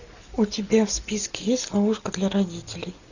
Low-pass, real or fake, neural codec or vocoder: 7.2 kHz; real; none